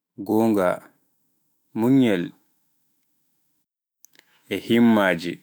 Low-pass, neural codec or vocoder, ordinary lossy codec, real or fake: none; autoencoder, 48 kHz, 128 numbers a frame, DAC-VAE, trained on Japanese speech; none; fake